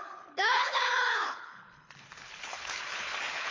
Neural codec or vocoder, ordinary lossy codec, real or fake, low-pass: codec, 24 kHz, 6 kbps, HILCodec; AAC, 32 kbps; fake; 7.2 kHz